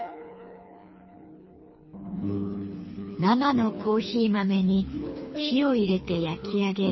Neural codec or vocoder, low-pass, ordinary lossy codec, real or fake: codec, 24 kHz, 3 kbps, HILCodec; 7.2 kHz; MP3, 24 kbps; fake